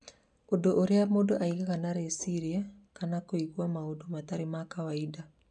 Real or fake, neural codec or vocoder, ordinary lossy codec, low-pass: real; none; none; 9.9 kHz